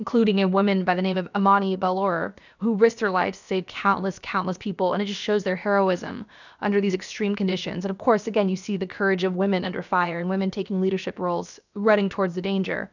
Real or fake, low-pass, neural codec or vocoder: fake; 7.2 kHz; codec, 16 kHz, about 1 kbps, DyCAST, with the encoder's durations